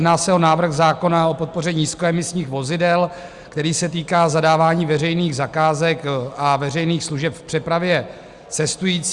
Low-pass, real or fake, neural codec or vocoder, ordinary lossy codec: 10.8 kHz; real; none; Opus, 64 kbps